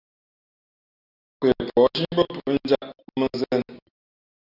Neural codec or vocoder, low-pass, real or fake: none; 5.4 kHz; real